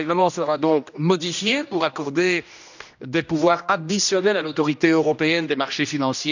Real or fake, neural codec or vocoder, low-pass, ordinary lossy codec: fake; codec, 16 kHz, 1 kbps, X-Codec, HuBERT features, trained on general audio; 7.2 kHz; none